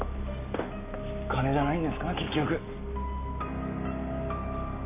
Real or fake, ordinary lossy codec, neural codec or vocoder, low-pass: real; none; none; 3.6 kHz